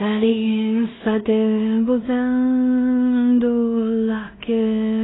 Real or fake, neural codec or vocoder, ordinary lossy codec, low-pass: fake; codec, 16 kHz in and 24 kHz out, 0.4 kbps, LongCat-Audio-Codec, two codebook decoder; AAC, 16 kbps; 7.2 kHz